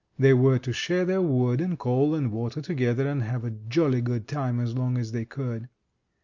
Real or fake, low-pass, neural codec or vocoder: real; 7.2 kHz; none